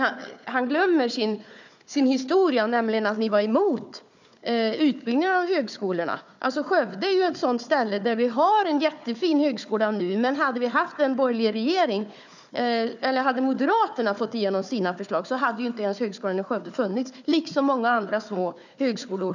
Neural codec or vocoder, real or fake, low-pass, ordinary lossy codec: codec, 16 kHz, 4 kbps, FunCodec, trained on Chinese and English, 50 frames a second; fake; 7.2 kHz; none